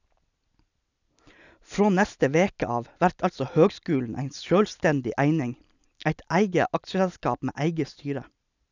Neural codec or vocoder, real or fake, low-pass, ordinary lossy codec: none; real; 7.2 kHz; none